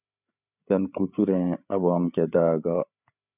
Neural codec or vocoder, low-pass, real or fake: codec, 16 kHz, 8 kbps, FreqCodec, larger model; 3.6 kHz; fake